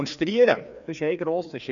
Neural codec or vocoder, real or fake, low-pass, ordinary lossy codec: codec, 16 kHz, 2 kbps, FreqCodec, larger model; fake; 7.2 kHz; none